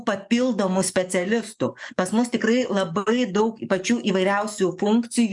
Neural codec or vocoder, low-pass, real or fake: codec, 44.1 kHz, 7.8 kbps, DAC; 10.8 kHz; fake